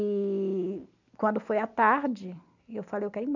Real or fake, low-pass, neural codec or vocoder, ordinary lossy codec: real; 7.2 kHz; none; none